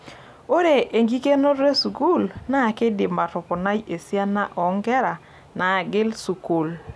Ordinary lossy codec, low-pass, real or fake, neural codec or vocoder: none; none; real; none